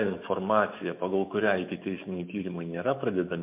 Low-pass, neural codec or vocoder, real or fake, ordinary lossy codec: 3.6 kHz; autoencoder, 48 kHz, 128 numbers a frame, DAC-VAE, trained on Japanese speech; fake; MP3, 32 kbps